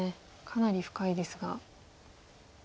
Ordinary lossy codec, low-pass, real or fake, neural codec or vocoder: none; none; real; none